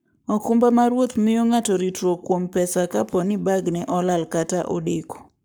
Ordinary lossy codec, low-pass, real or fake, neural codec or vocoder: none; none; fake; codec, 44.1 kHz, 7.8 kbps, Pupu-Codec